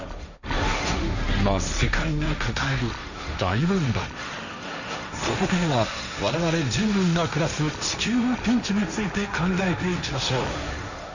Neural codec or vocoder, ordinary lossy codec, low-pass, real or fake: codec, 16 kHz, 1.1 kbps, Voila-Tokenizer; none; 7.2 kHz; fake